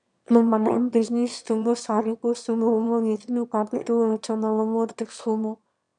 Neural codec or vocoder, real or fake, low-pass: autoencoder, 22.05 kHz, a latent of 192 numbers a frame, VITS, trained on one speaker; fake; 9.9 kHz